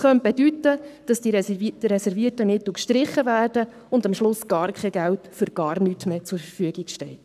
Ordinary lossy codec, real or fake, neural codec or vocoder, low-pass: none; fake; codec, 44.1 kHz, 7.8 kbps, Pupu-Codec; 14.4 kHz